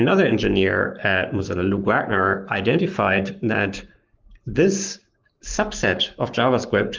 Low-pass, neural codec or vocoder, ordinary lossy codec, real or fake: 7.2 kHz; codec, 44.1 kHz, 7.8 kbps, Pupu-Codec; Opus, 32 kbps; fake